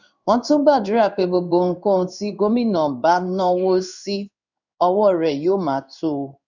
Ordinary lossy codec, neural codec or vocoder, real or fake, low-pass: none; codec, 16 kHz in and 24 kHz out, 1 kbps, XY-Tokenizer; fake; 7.2 kHz